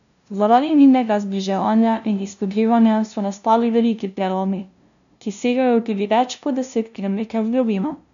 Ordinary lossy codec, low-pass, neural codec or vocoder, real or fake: none; 7.2 kHz; codec, 16 kHz, 0.5 kbps, FunCodec, trained on LibriTTS, 25 frames a second; fake